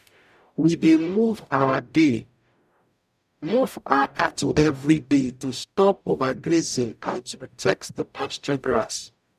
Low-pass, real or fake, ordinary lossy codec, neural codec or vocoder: 14.4 kHz; fake; none; codec, 44.1 kHz, 0.9 kbps, DAC